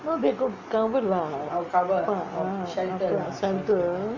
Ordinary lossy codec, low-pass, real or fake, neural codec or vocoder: none; 7.2 kHz; real; none